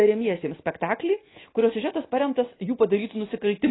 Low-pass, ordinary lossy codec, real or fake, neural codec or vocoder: 7.2 kHz; AAC, 16 kbps; real; none